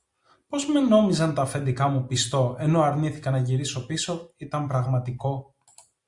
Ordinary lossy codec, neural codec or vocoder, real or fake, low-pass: Opus, 64 kbps; none; real; 10.8 kHz